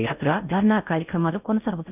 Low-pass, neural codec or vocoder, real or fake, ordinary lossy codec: 3.6 kHz; codec, 16 kHz in and 24 kHz out, 0.6 kbps, FocalCodec, streaming, 4096 codes; fake; none